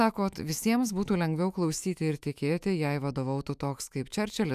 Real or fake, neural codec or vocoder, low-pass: fake; autoencoder, 48 kHz, 128 numbers a frame, DAC-VAE, trained on Japanese speech; 14.4 kHz